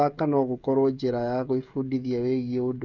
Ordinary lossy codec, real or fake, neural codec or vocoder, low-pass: Opus, 64 kbps; fake; codec, 16 kHz, 16 kbps, FreqCodec, smaller model; 7.2 kHz